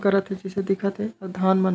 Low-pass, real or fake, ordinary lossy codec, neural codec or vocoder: none; real; none; none